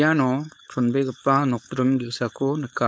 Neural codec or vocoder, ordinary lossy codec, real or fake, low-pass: codec, 16 kHz, 4.8 kbps, FACodec; none; fake; none